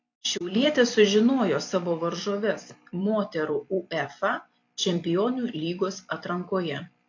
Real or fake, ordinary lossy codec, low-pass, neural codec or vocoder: fake; AAC, 48 kbps; 7.2 kHz; vocoder, 44.1 kHz, 128 mel bands every 256 samples, BigVGAN v2